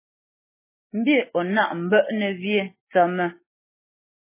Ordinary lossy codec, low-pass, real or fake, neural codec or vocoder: MP3, 16 kbps; 3.6 kHz; real; none